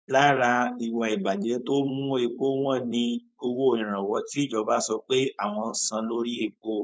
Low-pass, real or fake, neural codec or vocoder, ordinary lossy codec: none; fake; codec, 16 kHz, 4.8 kbps, FACodec; none